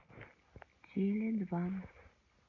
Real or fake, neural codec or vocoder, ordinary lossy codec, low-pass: real; none; AAC, 48 kbps; 7.2 kHz